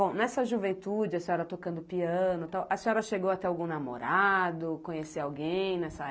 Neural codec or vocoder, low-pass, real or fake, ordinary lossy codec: none; none; real; none